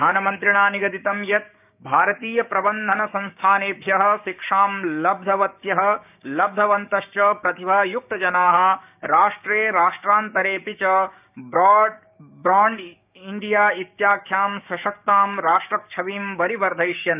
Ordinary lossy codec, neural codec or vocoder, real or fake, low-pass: none; codec, 16 kHz, 6 kbps, DAC; fake; 3.6 kHz